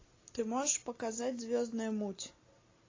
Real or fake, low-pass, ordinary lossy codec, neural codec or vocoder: real; 7.2 kHz; AAC, 32 kbps; none